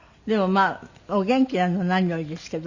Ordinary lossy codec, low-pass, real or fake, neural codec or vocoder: Opus, 64 kbps; 7.2 kHz; real; none